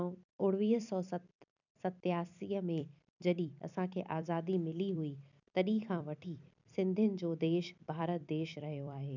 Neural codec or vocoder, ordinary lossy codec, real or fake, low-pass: vocoder, 44.1 kHz, 128 mel bands every 256 samples, BigVGAN v2; none; fake; 7.2 kHz